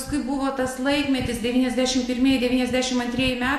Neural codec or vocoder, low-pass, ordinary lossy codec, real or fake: none; 14.4 kHz; MP3, 64 kbps; real